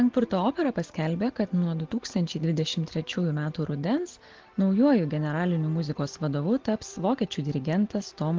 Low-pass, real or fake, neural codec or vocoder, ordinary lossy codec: 7.2 kHz; real; none; Opus, 16 kbps